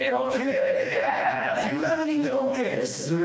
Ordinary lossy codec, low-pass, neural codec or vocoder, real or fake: none; none; codec, 16 kHz, 1 kbps, FreqCodec, smaller model; fake